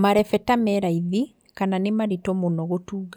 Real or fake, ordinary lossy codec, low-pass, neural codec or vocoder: real; none; none; none